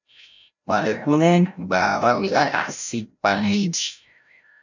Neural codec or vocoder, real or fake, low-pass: codec, 16 kHz, 0.5 kbps, FreqCodec, larger model; fake; 7.2 kHz